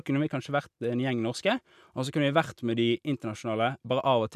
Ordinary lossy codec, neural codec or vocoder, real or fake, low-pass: none; none; real; 14.4 kHz